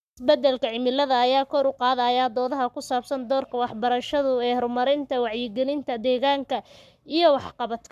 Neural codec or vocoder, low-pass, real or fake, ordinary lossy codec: codec, 44.1 kHz, 7.8 kbps, Pupu-Codec; 14.4 kHz; fake; none